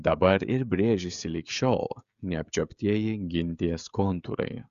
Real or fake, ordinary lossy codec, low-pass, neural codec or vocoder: fake; Opus, 64 kbps; 7.2 kHz; codec, 16 kHz, 16 kbps, FreqCodec, smaller model